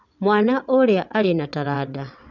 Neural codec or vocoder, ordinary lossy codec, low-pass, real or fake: vocoder, 22.05 kHz, 80 mel bands, WaveNeXt; none; 7.2 kHz; fake